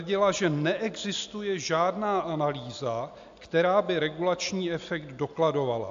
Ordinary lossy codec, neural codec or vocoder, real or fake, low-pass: AAC, 64 kbps; none; real; 7.2 kHz